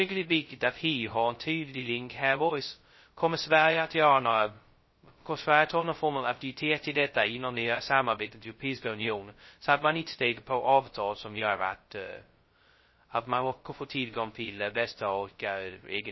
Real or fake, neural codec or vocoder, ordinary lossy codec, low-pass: fake; codec, 16 kHz, 0.2 kbps, FocalCodec; MP3, 24 kbps; 7.2 kHz